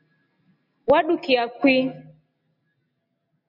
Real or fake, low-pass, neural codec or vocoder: real; 5.4 kHz; none